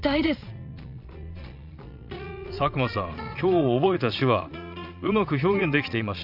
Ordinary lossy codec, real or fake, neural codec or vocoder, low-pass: none; fake; vocoder, 22.05 kHz, 80 mel bands, Vocos; 5.4 kHz